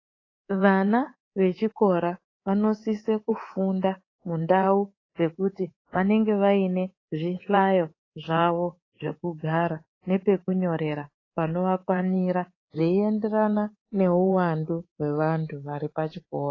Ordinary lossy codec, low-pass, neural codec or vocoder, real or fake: AAC, 32 kbps; 7.2 kHz; codec, 24 kHz, 3.1 kbps, DualCodec; fake